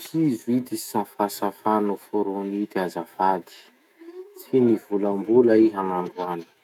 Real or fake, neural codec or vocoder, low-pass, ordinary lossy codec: fake; autoencoder, 48 kHz, 128 numbers a frame, DAC-VAE, trained on Japanese speech; 19.8 kHz; none